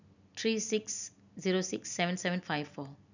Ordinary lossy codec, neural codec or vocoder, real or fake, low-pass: none; none; real; 7.2 kHz